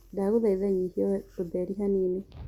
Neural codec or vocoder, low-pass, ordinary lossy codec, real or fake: none; 19.8 kHz; Opus, 64 kbps; real